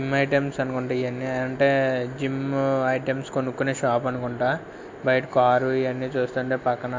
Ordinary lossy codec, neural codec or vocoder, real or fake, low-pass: MP3, 48 kbps; none; real; 7.2 kHz